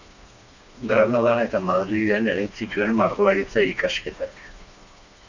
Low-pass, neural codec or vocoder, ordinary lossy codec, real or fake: 7.2 kHz; codec, 16 kHz, 2 kbps, FreqCodec, smaller model; Opus, 64 kbps; fake